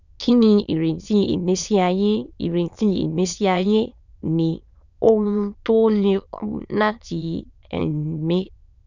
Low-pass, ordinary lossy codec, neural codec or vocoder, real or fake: 7.2 kHz; none; autoencoder, 22.05 kHz, a latent of 192 numbers a frame, VITS, trained on many speakers; fake